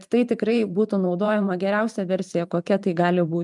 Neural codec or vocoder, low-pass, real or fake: vocoder, 44.1 kHz, 128 mel bands every 512 samples, BigVGAN v2; 10.8 kHz; fake